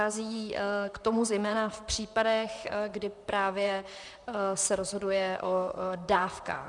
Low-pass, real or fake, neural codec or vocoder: 10.8 kHz; fake; vocoder, 44.1 kHz, 128 mel bands, Pupu-Vocoder